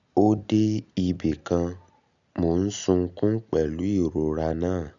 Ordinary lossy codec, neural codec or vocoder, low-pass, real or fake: none; none; 7.2 kHz; real